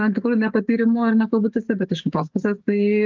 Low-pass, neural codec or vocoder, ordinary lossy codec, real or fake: 7.2 kHz; codec, 44.1 kHz, 7.8 kbps, DAC; Opus, 24 kbps; fake